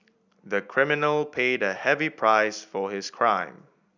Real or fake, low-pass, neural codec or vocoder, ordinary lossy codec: real; 7.2 kHz; none; none